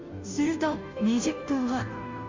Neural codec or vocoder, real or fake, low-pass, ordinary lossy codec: codec, 16 kHz, 0.5 kbps, FunCodec, trained on Chinese and English, 25 frames a second; fake; 7.2 kHz; MP3, 48 kbps